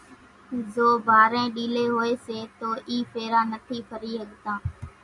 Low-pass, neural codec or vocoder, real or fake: 10.8 kHz; none; real